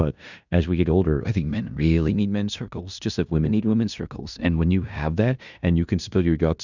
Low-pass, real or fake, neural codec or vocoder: 7.2 kHz; fake; codec, 16 kHz in and 24 kHz out, 0.9 kbps, LongCat-Audio-Codec, fine tuned four codebook decoder